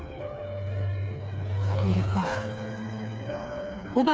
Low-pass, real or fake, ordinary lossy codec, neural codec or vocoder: none; fake; none; codec, 16 kHz, 4 kbps, FreqCodec, smaller model